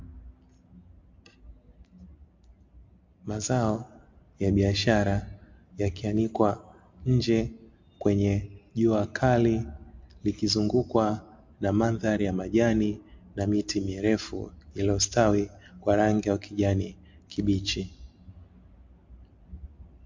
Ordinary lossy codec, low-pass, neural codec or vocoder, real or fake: MP3, 48 kbps; 7.2 kHz; none; real